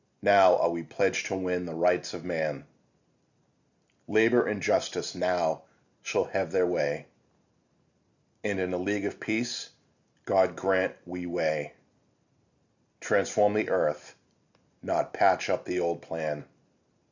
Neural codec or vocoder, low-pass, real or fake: none; 7.2 kHz; real